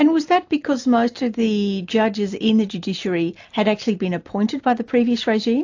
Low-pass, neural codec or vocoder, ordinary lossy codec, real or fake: 7.2 kHz; none; AAC, 48 kbps; real